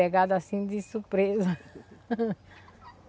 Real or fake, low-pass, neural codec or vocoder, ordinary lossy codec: real; none; none; none